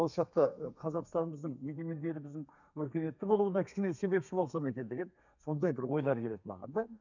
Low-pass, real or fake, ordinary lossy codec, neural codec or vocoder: 7.2 kHz; fake; none; codec, 32 kHz, 1.9 kbps, SNAC